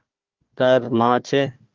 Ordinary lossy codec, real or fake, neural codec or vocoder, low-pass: Opus, 32 kbps; fake; codec, 16 kHz, 1 kbps, FunCodec, trained on Chinese and English, 50 frames a second; 7.2 kHz